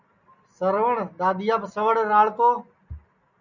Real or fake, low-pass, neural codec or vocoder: real; 7.2 kHz; none